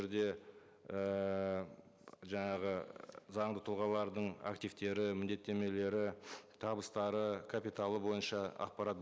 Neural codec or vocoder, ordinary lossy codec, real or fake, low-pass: none; none; real; none